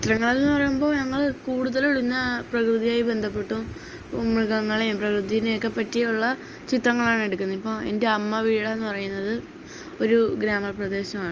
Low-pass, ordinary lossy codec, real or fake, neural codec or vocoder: 7.2 kHz; Opus, 24 kbps; real; none